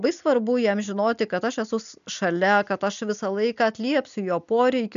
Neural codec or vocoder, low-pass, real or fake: none; 7.2 kHz; real